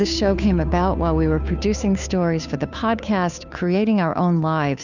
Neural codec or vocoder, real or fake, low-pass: codec, 16 kHz, 2 kbps, FunCodec, trained on Chinese and English, 25 frames a second; fake; 7.2 kHz